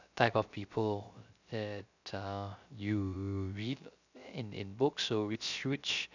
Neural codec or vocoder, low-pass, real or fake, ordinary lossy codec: codec, 16 kHz, 0.3 kbps, FocalCodec; 7.2 kHz; fake; none